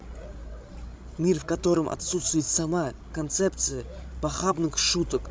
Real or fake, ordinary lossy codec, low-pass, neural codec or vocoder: fake; none; none; codec, 16 kHz, 16 kbps, FreqCodec, larger model